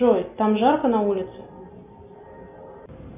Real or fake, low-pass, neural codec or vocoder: real; 3.6 kHz; none